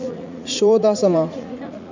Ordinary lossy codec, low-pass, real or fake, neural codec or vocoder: none; 7.2 kHz; fake; vocoder, 44.1 kHz, 80 mel bands, Vocos